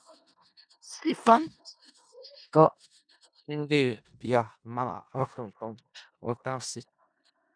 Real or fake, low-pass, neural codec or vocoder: fake; 9.9 kHz; codec, 16 kHz in and 24 kHz out, 0.4 kbps, LongCat-Audio-Codec, four codebook decoder